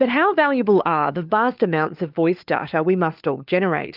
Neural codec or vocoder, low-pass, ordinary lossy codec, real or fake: codec, 16 kHz, 4 kbps, FunCodec, trained on LibriTTS, 50 frames a second; 5.4 kHz; Opus, 24 kbps; fake